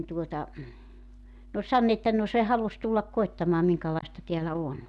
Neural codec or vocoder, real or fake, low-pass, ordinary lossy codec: none; real; none; none